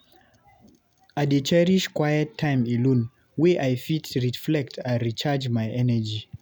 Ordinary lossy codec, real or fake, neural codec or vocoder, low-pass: none; real; none; none